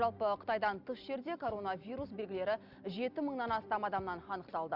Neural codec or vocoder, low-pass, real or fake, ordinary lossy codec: none; 5.4 kHz; real; Opus, 64 kbps